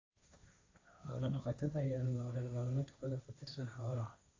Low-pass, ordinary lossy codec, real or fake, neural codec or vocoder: 7.2 kHz; AAC, 32 kbps; fake; codec, 16 kHz, 1.1 kbps, Voila-Tokenizer